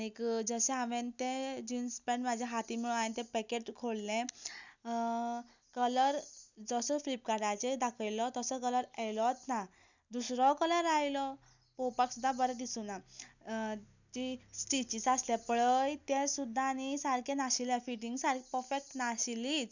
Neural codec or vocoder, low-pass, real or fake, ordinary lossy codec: none; 7.2 kHz; real; none